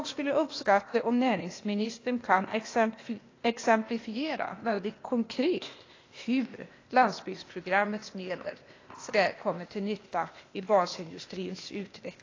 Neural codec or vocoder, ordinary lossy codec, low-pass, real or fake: codec, 16 kHz, 0.8 kbps, ZipCodec; AAC, 32 kbps; 7.2 kHz; fake